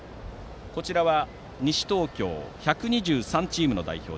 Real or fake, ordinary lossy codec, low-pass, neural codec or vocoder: real; none; none; none